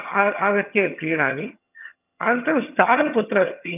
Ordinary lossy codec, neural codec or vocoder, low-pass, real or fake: none; vocoder, 22.05 kHz, 80 mel bands, HiFi-GAN; 3.6 kHz; fake